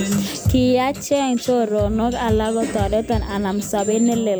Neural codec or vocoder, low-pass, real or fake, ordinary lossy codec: none; none; real; none